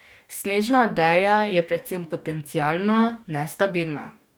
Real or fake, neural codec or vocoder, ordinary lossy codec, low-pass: fake; codec, 44.1 kHz, 2.6 kbps, DAC; none; none